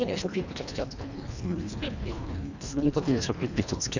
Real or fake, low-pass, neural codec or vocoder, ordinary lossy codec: fake; 7.2 kHz; codec, 24 kHz, 1.5 kbps, HILCodec; none